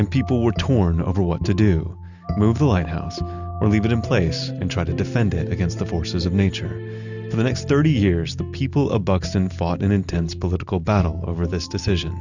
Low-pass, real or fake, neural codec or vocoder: 7.2 kHz; real; none